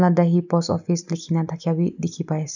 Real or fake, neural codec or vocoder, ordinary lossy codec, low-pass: real; none; none; 7.2 kHz